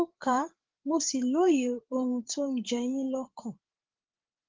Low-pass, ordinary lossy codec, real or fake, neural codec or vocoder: 7.2 kHz; Opus, 16 kbps; fake; codec, 16 kHz, 8 kbps, FreqCodec, larger model